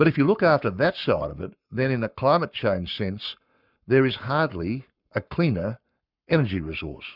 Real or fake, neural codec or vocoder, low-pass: fake; codec, 44.1 kHz, 7.8 kbps, Pupu-Codec; 5.4 kHz